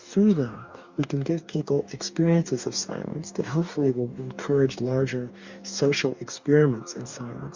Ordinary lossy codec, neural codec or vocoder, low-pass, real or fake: Opus, 64 kbps; codec, 44.1 kHz, 2.6 kbps, DAC; 7.2 kHz; fake